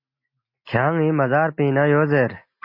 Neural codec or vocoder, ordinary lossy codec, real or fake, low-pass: none; MP3, 32 kbps; real; 5.4 kHz